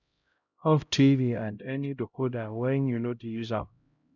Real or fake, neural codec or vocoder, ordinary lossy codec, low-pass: fake; codec, 16 kHz, 0.5 kbps, X-Codec, HuBERT features, trained on LibriSpeech; none; 7.2 kHz